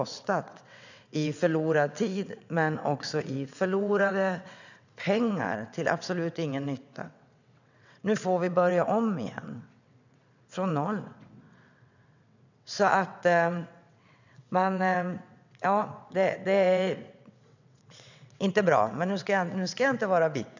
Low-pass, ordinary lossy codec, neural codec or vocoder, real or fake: 7.2 kHz; none; vocoder, 22.05 kHz, 80 mel bands, WaveNeXt; fake